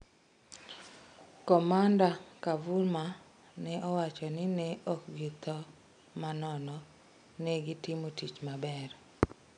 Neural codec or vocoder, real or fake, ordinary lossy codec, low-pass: none; real; none; 9.9 kHz